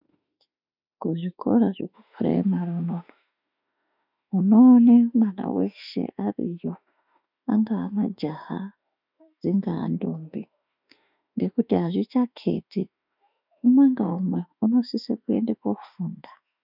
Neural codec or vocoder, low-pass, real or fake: autoencoder, 48 kHz, 32 numbers a frame, DAC-VAE, trained on Japanese speech; 5.4 kHz; fake